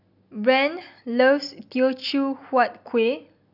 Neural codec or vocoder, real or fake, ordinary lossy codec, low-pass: none; real; none; 5.4 kHz